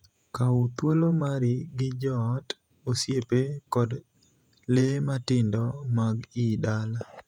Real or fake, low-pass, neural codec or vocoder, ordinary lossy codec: fake; 19.8 kHz; vocoder, 48 kHz, 128 mel bands, Vocos; none